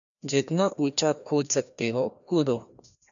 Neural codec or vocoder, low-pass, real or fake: codec, 16 kHz, 1 kbps, FreqCodec, larger model; 7.2 kHz; fake